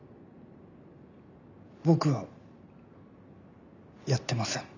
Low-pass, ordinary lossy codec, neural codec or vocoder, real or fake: 7.2 kHz; none; none; real